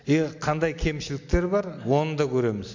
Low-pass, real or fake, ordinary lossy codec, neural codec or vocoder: 7.2 kHz; real; MP3, 48 kbps; none